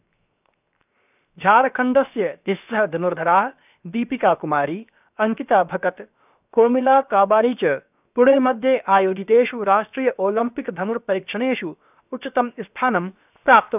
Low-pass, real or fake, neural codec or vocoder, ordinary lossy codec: 3.6 kHz; fake; codec, 16 kHz, 0.7 kbps, FocalCodec; none